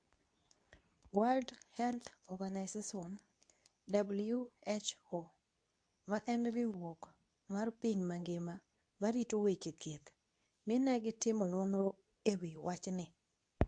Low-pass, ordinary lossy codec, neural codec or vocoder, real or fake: 9.9 kHz; AAC, 64 kbps; codec, 24 kHz, 0.9 kbps, WavTokenizer, medium speech release version 2; fake